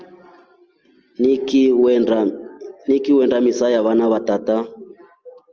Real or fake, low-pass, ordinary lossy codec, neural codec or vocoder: real; 7.2 kHz; Opus, 24 kbps; none